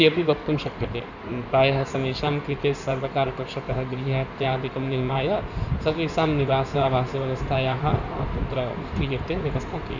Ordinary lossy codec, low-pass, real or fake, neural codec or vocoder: none; 7.2 kHz; fake; codec, 16 kHz in and 24 kHz out, 2.2 kbps, FireRedTTS-2 codec